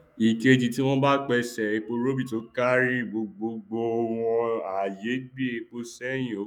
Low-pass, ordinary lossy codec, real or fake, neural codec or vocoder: 19.8 kHz; none; fake; autoencoder, 48 kHz, 128 numbers a frame, DAC-VAE, trained on Japanese speech